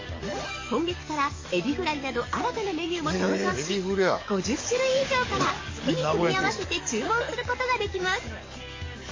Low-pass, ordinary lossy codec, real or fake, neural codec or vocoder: 7.2 kHz; MP3, 32 kbps; fake; codec, 44.1 kHz, 7.8 kbps, DAC